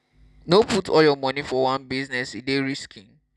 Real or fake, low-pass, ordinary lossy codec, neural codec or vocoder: real; none; none; none